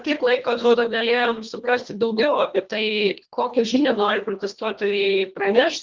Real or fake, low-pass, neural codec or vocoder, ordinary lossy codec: fake; 7.2 kHz; codec, 24 kHz, 1.5 kbps, HILCodec; Opus, 32 kbps